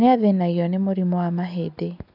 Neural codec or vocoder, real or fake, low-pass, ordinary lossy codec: none; real; 5.4 kHz; none